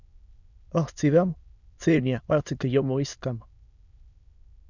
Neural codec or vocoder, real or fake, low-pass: autoencoder, 22.05 kHz, a latent of 192 numbers a frame, VITS, trained on many speakers; fake; 7.2 kHz